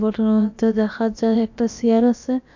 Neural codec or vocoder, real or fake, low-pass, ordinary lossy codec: codec, 16 kHz, about 1 kbps, DyCAST, with the encoder's durations; fake; 7.2 kHz; none